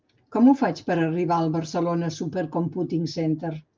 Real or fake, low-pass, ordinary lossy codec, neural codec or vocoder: real; 7.2 kHz; Opus, 24 kbps; none